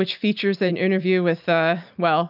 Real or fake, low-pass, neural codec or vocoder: real; 5.4 kHz; none